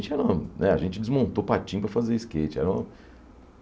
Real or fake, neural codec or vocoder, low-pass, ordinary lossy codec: real; none; none; none